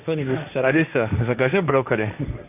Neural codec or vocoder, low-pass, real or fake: codec, 16 kHz, 1.1 kbps, Voila-Tokenizer; 3.6 kHz; fake